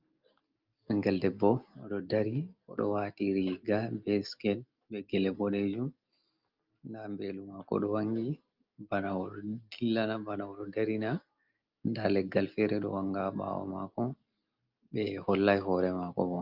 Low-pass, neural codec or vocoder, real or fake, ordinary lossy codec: 5.4 kHz; none; real; Opus, 24 kbps